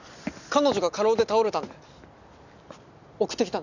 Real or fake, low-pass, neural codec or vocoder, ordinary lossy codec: real; 7.2 kHz; none; none